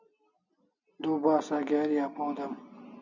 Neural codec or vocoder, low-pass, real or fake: none; 7.2 kHz; real